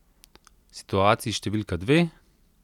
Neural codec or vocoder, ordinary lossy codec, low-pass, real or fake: none; none; 19.8 kHz; real